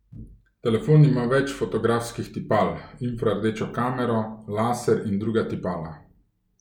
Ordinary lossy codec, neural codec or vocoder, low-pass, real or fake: none; none; 19.8 kHz; real